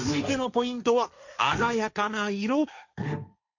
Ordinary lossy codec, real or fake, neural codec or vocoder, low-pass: none; fake; codec, 16 kHz, 1.1 kbps, Voila-Tokenizer; 7.2 kHz